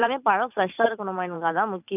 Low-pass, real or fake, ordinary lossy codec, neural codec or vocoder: 3.6 kHz; fake; AAC, 32 kbps; vocoder, 44.1 kHz, 128 mel bands every 512 samples, BigVGAN v2